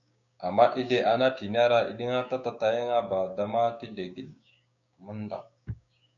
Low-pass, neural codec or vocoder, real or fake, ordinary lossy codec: 7.2 kHz; codec, 16 kHz, 6 kbps, DAC; fake; MP3, 96 kbps